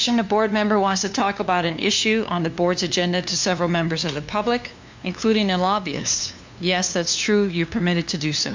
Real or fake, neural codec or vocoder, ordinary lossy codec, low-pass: fake; codec, 16 kHz, 2 kbps, FunCodec, trained on LibriTTS, 25 frames a second; MP3, 64 kbps; 7.2 kHz